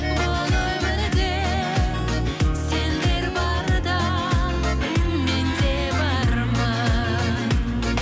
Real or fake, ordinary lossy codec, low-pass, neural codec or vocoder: real; none; none; none